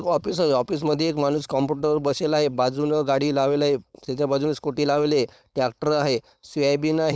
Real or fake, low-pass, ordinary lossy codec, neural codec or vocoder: fake; none; none; codec, 16 kHz, 4.8 kbps, FACodec